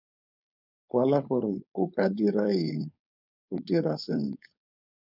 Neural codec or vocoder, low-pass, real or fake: codec, 16 kHz, 4.8 kbps, FACodec; 5.4 kHz; fake